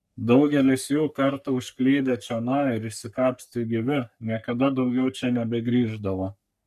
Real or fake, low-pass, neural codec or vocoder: fake; 14.4 kHz; codec, 44.1 kHz, 3.4 kbps, Pupu-Codec